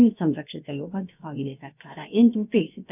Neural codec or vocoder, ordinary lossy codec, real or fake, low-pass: codec, 16 kHz, about 1 kbps, DyCAST, with the encoder's durations; none; fake; 3.6 kHz